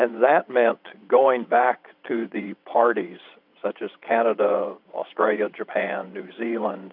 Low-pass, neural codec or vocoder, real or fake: 5.4 kHz; vocoder, 22.05 kHz, 80 mel bands, WaveNeXt; fake